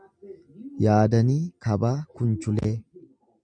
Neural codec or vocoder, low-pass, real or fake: none; 9.9 kHz; real